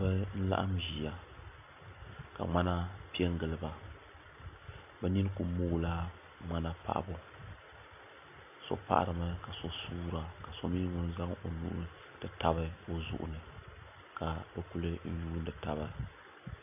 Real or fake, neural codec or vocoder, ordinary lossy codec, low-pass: real; none; AAC, 32 kbps; 3.6 kHz